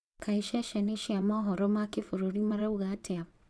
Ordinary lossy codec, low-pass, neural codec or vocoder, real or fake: none; none; vocoder, 22.05 kHz, 80 mel bands, WaveNeXt; fake